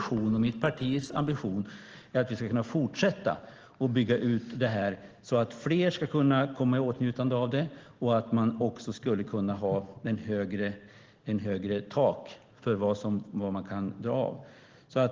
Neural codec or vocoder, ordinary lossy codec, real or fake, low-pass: none; Opus, 16 kbps; real; 7.2 kHz